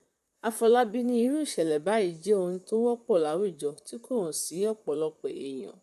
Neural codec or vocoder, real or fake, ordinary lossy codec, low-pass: vocoder, 44.1 kHz, 128 mel bands, Pupu-Vocoder; fake; none; 14.4 kHz